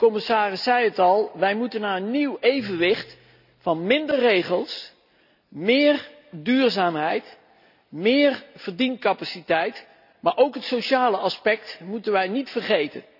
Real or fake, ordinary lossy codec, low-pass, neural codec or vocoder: real; none; 5.4 kHz; none